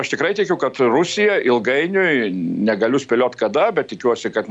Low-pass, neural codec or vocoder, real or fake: 9.9 kHz; none; real